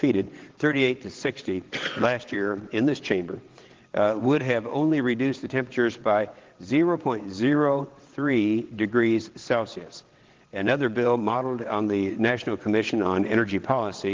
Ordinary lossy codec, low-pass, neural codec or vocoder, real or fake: Opus, 16 kbps; 7.2 kHz; vocoder, 22.05 kHz, 80 mel bands, WaveNeXt; fake